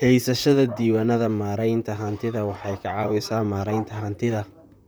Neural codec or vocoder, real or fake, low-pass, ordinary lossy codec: vocoder, 44.1 kHz, 128 mel bands, Pupu-Vocoder; fake; none; none